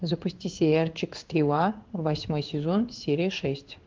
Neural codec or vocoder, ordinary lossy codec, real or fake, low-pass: codec, 16 kHz in and 24 kHz out, 1 kbps, XY-Tokenizer; Opus, 32 kbps; fake; 7.2 kHz